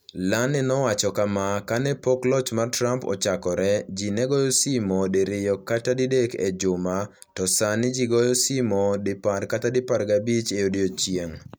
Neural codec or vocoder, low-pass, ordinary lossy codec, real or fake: none; none; none; real